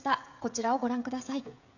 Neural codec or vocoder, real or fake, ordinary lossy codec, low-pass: codec, 16 kHz, 16 kbps, FreqCodec, smaller model; fake; none; 7.2 kHz